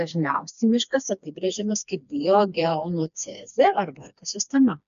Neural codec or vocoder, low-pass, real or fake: codec, 16 kHz, 2 kbps, FreqCodec, smaller model; 7.2 kHz; fake